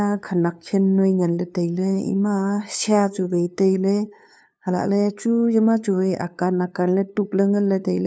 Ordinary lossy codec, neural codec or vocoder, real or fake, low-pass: none; codec, 16 kHz, 2 kbps, FunCodec, trained on LibriTTS, 25 frames a second; fake; none